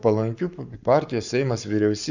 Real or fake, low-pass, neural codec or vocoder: fake; 7.2 kHz; codec, 44.1 kHz, 7.8 kbps, Pupu-Codec